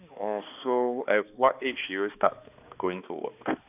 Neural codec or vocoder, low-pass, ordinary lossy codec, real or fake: codec, 16 kHz, 2 kbps, X-Codec, HuBERT features, trained on balanced general audio; 3.6 kHz; none; fake